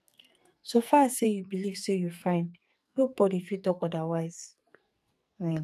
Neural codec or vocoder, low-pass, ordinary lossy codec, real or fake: codec, 44.1 kHz, 2.6 kbps, SNAC; 14.4 kHz; none; fake